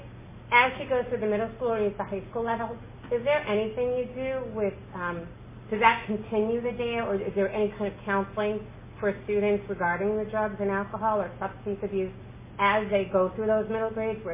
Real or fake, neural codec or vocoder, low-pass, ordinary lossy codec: real; none; 3.6 kHz; MP3, 16 kbps